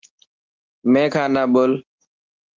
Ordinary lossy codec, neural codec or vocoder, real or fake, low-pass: Opus, 16 kbps; none; real; 7.2 kHz